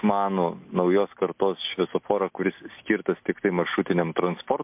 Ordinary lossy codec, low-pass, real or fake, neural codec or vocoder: MP3, 32 kbps; 3.6 kHz; real; none